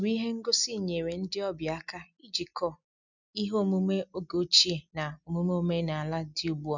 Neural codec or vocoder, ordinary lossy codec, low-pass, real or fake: none; none; 7.2 kHz; real